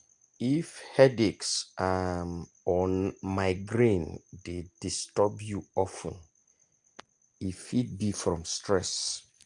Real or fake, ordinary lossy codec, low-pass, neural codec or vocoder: real; Opus, 32 kbps; 9.9 kHz; none